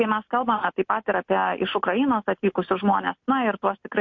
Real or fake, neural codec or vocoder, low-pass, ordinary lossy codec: real; none; 7.2 kHz; MP3, 48 kbps